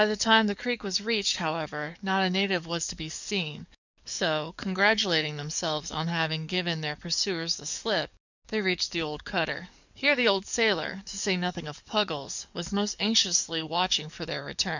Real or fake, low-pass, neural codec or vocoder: fake; 7.2 kHz; codec, 44.1 kHz, 7.8 kbps, DAC